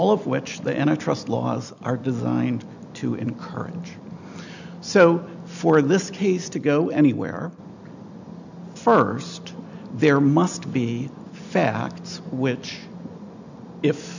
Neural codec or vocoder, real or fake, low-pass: none; real; 7.2 kHz